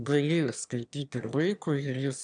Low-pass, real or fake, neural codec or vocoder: 9.9 kHz; fake; autoencoder, 22.05 kHz, a latent of 192 numbers a frame, VITS, trained on one speaker